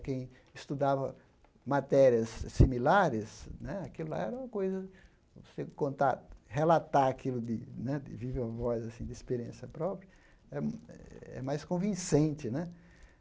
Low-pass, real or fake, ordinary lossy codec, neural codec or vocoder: none; real; none; none